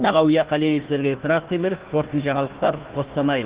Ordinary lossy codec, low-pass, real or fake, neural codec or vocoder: Opus, 16 kbps; 3.6 kHz; fake; codec, 16 kHz, 1 kbps, FunCodec, trained on Chinese and English, 50 frames a second